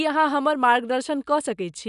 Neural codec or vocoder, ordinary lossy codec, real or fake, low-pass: none; none; real; 10.8 kHz